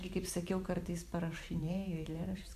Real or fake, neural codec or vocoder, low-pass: fake; vocoder, 44.1 kHz, 128 mel bands every 512 samples, BigVGAN v2; 14.4 kHz